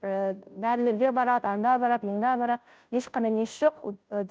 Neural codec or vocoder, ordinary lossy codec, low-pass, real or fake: codec, 16 kHz, 0.5 kbps, FunCodec, trained on Chinese and English, 25 frames a second; none; none; fake